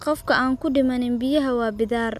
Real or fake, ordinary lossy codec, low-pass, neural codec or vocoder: real; none; 14.4 kHz; none